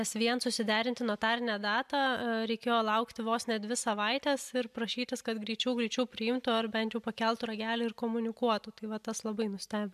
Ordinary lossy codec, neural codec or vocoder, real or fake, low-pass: MP3, 96 kbps; none; real; 14.4 kHz